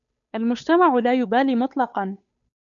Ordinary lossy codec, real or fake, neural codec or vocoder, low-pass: MP3, 96 kbps; fake; codec, 16 kHz, 8 kbps, FunCodec, trained on Chinese and English, 25 frames a second; 7.2 kHz